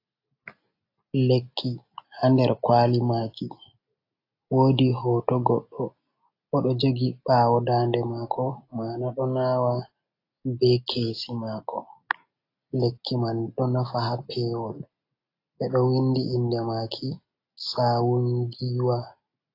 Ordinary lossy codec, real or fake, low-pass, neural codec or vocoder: AAC, 32 kbps; real; 5.4 kHz; none